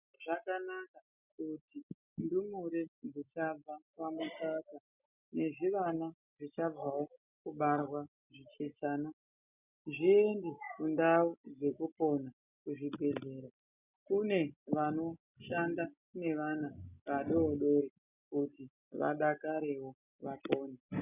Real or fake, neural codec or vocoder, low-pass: real; none; 3.6 kHz